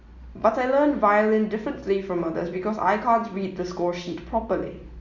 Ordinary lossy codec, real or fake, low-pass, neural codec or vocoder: Opus, 64 kbps; real; 7.2 kHz; none